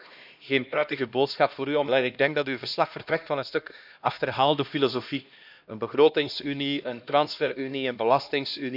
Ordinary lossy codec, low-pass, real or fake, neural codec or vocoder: none; 5.4 kHz; fake; codec, 16 kHz, 1 kbps, X-Codec, HuBERT features, trained on LibriSpeech